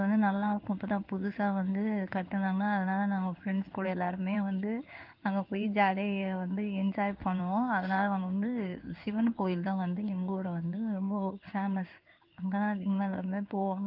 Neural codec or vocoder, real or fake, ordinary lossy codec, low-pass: codec, 16 kHz in and 24 kHz out, 1 kbps, XY-Tokenizer; fake; Opus, 24 kbps; 5.4 kHz